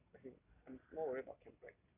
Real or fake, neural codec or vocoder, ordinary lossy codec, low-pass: real; none; none; 3.6 kHz